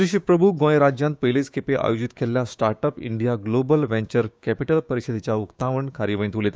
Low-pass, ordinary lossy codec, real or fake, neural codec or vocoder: none; none; fake; codec, 16 kHz, 6 kbps, DAC